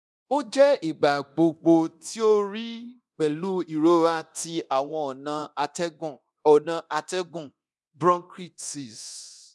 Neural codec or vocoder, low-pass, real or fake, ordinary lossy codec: codec, 24 kHz, 0.9 kbps, DualCodec; none; fake; none